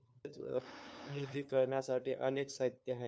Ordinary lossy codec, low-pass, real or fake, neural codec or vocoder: none; none; fake; codec, 16 kHz, 2 kbps, FunCodec, trained on LibriTTS, 25 frames a second